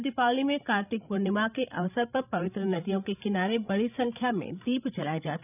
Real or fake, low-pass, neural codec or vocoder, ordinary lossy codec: fake; 3.6 kHz; codec, 16 kHz, 16 kbps, FreqCodec, larger model; none